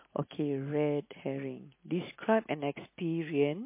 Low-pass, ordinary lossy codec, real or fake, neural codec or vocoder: 3.6 kHz; MP3, 24 kbps; real; none